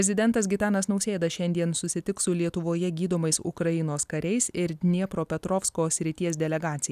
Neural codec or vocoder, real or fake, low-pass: none; real; 14.4 kHz